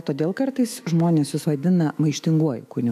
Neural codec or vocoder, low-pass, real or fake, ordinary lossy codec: autoencoder, 48 kHz, 128 numbers a frame, DAC-VAE, trained on Japanese speech; 14.4 kHz; fake; AAC, 96 kbps